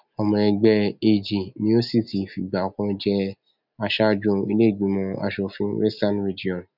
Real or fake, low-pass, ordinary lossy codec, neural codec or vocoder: real; 5.4 kHz; none; none